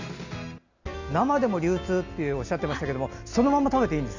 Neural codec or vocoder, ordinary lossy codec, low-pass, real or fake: none; none; 7.2 kHz; real